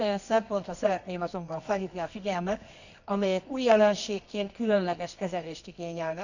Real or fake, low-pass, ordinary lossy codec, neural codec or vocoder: fake; 7.2 kHz; none; codec, 24 kHz, 0.9 kbps, WavTokenizer, medium music audio release